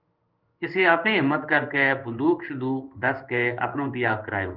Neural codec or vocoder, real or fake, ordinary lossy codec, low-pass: codec, 16 kHz in and 24 kHz out, 1 kbps, XY-Tokenizer; fake; Opus, 24 kbps; 5.4 kHz